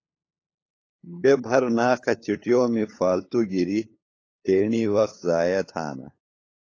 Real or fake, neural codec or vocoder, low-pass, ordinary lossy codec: fake; codec, 16 kHz, 8 kbps, FunCodec, trained on LibriTTS, 25 frames a second; 7.2 kHz; AAC, 32 kbps